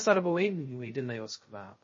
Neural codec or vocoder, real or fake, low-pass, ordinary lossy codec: codec, 16 kHz, 0.2 kbps, FocalCodec; fake; 7.2 kHz; MP3, 32 kbps